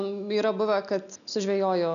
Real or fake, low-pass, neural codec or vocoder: real; 7.2 kHz; none